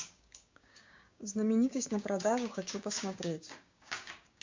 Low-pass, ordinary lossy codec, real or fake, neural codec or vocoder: 7.2 kHz; MP3, 48 kbps; fake; codec, 16 kHz, 6 kbps, DAC